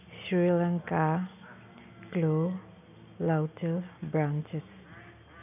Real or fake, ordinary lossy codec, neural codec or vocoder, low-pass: real; none; none; 3.6 kHz